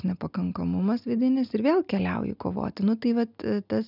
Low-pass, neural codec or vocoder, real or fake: 5.4 kHz; none; real